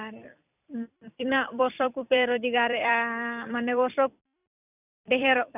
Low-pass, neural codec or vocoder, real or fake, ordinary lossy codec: 3.6 kHz; vocoder, 44.1 kHz, 128 mel bands every 256 samples, BigVGAN v2; fake; none